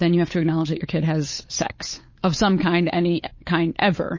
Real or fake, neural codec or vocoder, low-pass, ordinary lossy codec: fake; codec, 16 kHz, 8 kbps, FunCodec, trained on Chinese and English, 25 frames a second; 7.2 kHz; MP3, 32 kbps